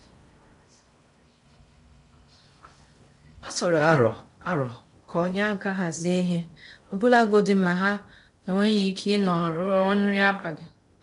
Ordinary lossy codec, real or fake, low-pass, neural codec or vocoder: MP3, 64 kbps; fake; 10.8 kHz; codec, 16 kHz in and 24 kHz out, 0.6 kbps, FocalCodec, streaming, 4096 codes